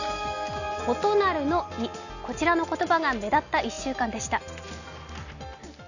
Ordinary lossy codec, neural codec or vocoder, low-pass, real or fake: none; none; 7.2 kHz; real